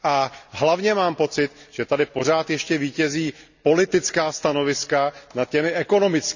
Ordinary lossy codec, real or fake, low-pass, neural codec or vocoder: none; real; 7.2 kHz; none